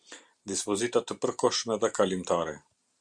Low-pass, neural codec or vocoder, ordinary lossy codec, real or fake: 9.9 kHz; none; Opus, 64 kbps; real